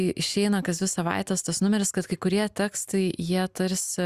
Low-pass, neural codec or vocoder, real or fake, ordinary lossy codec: 14.4 kHz; none; real; Opus, 64 kbps